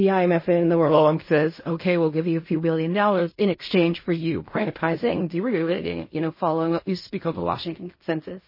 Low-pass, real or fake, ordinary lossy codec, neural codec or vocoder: 5.4 kHz; fake; MP3, 24 kbps; codec, 16 kHz in and 24 kHz out, 0.4 kbps, LongCat-Audio-Codec, fine tuned four codebook decoder